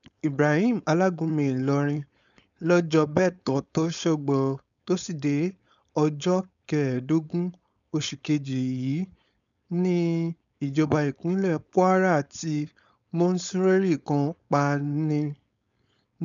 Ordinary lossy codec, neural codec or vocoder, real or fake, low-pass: none; codec, 16 kHz, 4.8 kbps, FACodec; fake; 7.2 kHz